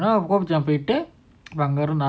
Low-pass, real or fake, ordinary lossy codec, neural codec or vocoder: none; real; none; none